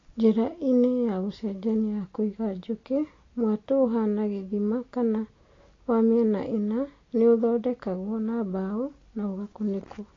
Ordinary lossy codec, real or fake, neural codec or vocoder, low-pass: AAC, 32 kbps; real; none; 7.2 kHz